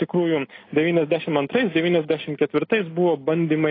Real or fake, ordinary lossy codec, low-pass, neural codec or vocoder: real; AAC, 24 kbps; 5.4 kHz; none